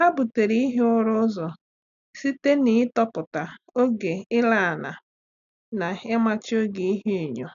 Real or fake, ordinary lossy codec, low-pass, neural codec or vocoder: real; none; 7.2 kHz; none